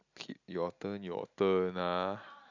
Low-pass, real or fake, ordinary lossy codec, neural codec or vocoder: 7.2 kHz; real; none; none